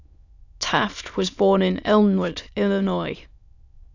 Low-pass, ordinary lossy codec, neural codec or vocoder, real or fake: 7.2 kHz; none; autoencoder, 22.05 kHz, a latent of 192 numbers a frame, VITS, trained on many speakers; fake